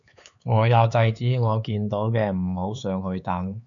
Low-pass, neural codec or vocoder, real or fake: 7.2 kHz; codec, 16 kHz, 4 kbps, X-Codec, HuBERT features, trained on LibriSpeech; fake